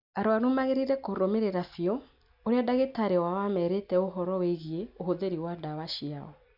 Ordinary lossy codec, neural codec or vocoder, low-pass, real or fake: none; none; 5.4 kHz; real